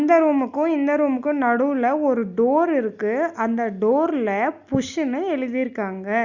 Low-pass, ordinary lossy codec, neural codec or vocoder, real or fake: 7.2 kHz; Opus, 64 kbps; none; real